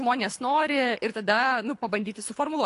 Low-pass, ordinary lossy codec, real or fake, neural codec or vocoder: 10.8 kHz; AAC, 48 kbps; fake; codec, 24 kHz, 3 kbps, HILCodec